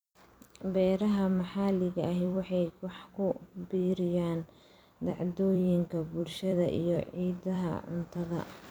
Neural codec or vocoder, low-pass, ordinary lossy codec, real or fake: vocoder, 44.1 kHz, 128 mel bands every 256 samples, BigVGAN v2; none; none; fake